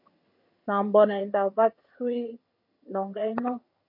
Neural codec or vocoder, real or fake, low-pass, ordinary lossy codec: vocoder, 22.05 kHz, 80 mel bands, HiFi-GAN; fake; 5.4 kHz; MP3, 32 kbps